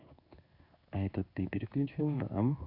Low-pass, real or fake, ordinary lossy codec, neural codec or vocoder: 5.4 kHz; fake; AAC, 48 kbps; codec, 16 kHz in and 24 kHz out, 1 kbps, XY-Tokenizer